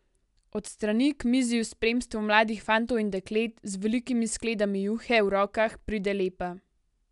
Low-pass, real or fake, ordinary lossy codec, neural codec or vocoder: 10.8 kHz; real; none; none